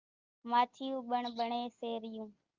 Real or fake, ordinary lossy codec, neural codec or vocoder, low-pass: real; Opus, 32 kbps; none; 7.2 kHz